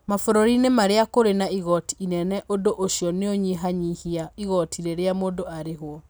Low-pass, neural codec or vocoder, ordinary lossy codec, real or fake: none; none; none; real